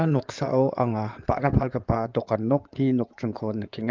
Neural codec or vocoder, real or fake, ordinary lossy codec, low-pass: codec, 16 kHz in and 24 kHz out, 2.2 kbps, FireRedTTS-2 codec; fake; Opus, 24 kbps; 7.2 kHz